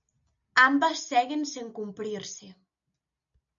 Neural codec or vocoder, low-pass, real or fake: none; 7.2 kHz; real